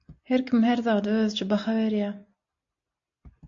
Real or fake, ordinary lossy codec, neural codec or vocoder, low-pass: real; AAC, 48 kbps; none; 7.2 kHz